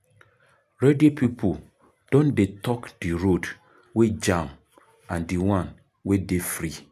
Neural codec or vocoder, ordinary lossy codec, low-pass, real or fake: none; none; 14.4 kHz; real